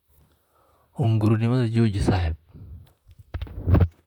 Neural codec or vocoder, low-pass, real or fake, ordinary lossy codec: vocoder, 44.1 kHz, 128 mel bands, Pupu-Vocoder; 19.8 kHz; fake; none